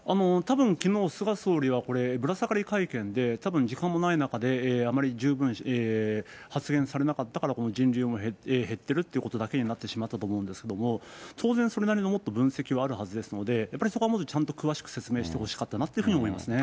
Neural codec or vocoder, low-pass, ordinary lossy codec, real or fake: none; none; none; real